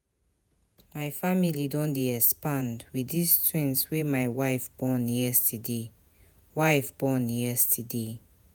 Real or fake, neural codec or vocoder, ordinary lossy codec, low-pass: fake; vocoder, 48 kHz, 128 mel bands, Vocos; none; none